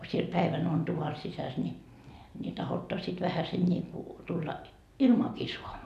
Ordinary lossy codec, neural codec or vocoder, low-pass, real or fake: none; none; 14.4 kHz; real